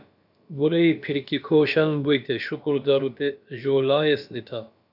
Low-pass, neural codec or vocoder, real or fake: 5.4 kHz; codec, 16 kHz, about 1 kbps, DyCAST, with the encoder's durations; fake